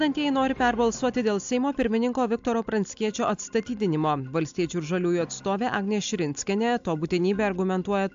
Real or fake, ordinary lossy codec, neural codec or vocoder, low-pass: real; AAC, 64 kbps; none; 7.2 kHz